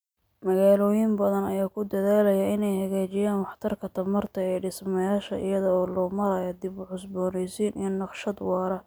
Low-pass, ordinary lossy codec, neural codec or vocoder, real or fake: none; none; none; real